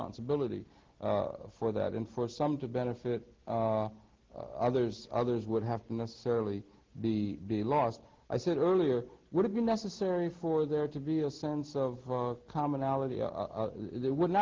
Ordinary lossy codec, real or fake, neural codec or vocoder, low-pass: Opus, 16 kbps; real; none; 7.2 kHz